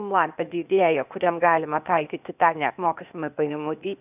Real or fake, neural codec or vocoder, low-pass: fake; codec, 16 kHz, 0.8 kbps, ZipCodec; 3.6 kHz